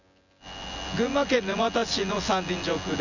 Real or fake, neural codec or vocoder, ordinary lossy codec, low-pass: fake; vocoder, 24 kHz, 100 mel bands, Vocos; none; 7.2 kHz